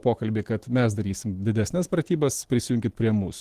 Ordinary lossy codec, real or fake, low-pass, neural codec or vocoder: Opus, 16 kbps; fake; 14.4 kHz; autoencoder, 48 kHz, 128 numbers a frame, DAC-VAE, trained on Japanese speech